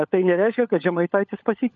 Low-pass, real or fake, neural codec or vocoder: 7.2 kHz; fake; codec, 16 kHz, 16 kbps, FunCodec, trained on LibriTTS, 50 frames a second